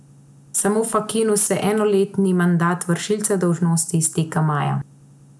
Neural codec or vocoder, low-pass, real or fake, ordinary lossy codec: none; none; real; none